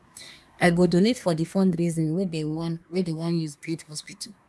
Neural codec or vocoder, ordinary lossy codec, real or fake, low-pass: codec, 24 kHz, 1 kbps, SNAC; none; fake; none